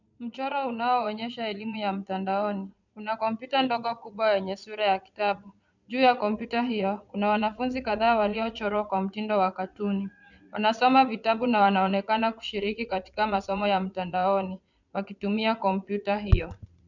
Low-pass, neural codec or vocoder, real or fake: 7.2 kHz; vocoder, 22.05 kHz, 80 mel bands, Vocos; fake